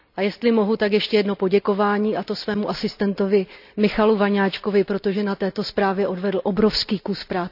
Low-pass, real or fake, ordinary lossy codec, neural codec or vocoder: 5.4 kHz; real; none; none